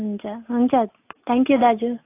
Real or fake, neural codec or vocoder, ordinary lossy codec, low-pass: real; none; AAC, 24 kbps; 3.6 kHz